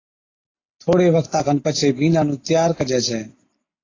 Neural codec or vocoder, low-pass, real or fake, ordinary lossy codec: none; 7.2 kHz; real; AAC, 32 kbps